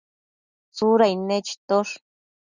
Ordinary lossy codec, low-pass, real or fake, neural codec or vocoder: Opus, 64 kbps; 7.2 kHz; real; none